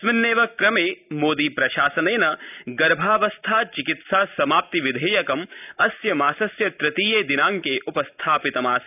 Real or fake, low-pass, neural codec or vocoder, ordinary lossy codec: real; 3.6 kHz; none; none